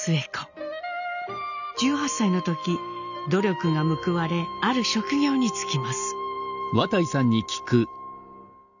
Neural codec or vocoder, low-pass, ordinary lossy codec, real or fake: none; 7.2 kHz; none; real